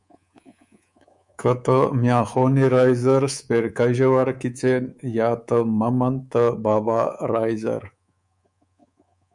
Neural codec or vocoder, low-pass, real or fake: codec, 24 kHz, 3.1 kbps, DualCodec; 10.8 kHz; fake